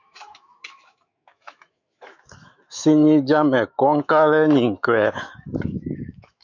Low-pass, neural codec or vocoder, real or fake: 7.2 kHz; autoencoder, 48 kHz, 128 numbers a frame, DAC-VAE, trained on Japanese speech; fake